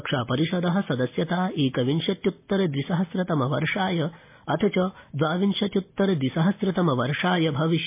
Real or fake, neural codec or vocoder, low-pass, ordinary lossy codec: real; none; 3.6 kHz; MP3, 24 kbps